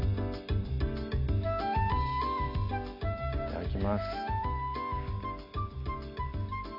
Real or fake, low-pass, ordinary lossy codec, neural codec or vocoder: real; 5.4 kHz; none; none